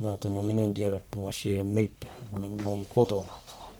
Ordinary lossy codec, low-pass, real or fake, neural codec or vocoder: none; none; fake; codec, 44.1 kHz, 1.7 kbps, Pupu-Codec